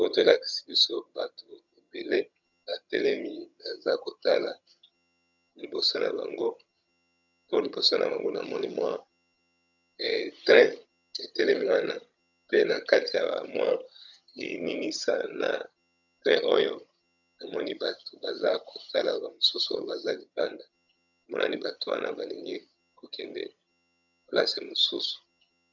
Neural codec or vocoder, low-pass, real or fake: vocoder, 22.05 kHz, 80 mel bands, HiFi-GAN; 7.2 kHz; fake